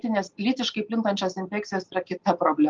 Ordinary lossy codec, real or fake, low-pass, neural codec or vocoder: Opus, 32 kbps; real; 7.2 kHz; none